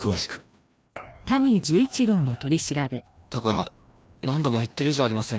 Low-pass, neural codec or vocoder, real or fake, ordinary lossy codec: none; codec, 16 kHz, 1 kbps, FreqCodec, larger model; fake; none